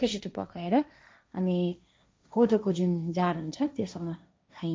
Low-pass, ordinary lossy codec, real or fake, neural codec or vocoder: none; none; fake; codec, 16 kHz, 1.1 kbps, Voila-Tokenizer